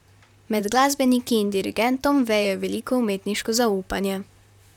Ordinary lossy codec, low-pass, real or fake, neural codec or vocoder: none; 19.8 kHz; fake; vocoder, 44.1 kHz, 128 mel bands, Pupu-Vocoder